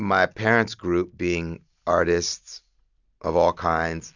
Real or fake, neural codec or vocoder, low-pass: real; none; 7.2 kHz